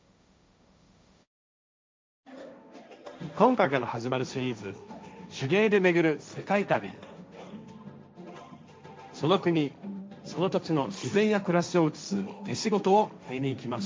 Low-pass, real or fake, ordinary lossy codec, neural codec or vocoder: none; fake; none; codec, 16 kHz, 1.1 kbps, Voila-Tokenizer